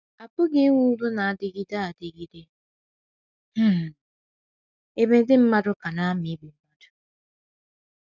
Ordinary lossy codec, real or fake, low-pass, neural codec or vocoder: none; real; 7.2 kHz; none